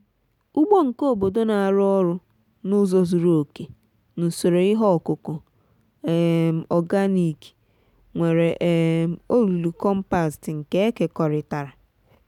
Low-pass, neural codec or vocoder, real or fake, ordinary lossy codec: 19.8 kHz; none; real; none